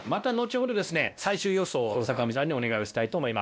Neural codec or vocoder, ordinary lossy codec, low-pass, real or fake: codec, 16 kHz, 1 kbps, X-Codec, WavLM features, trained on Multilingual LibriSpeech; none; none; fake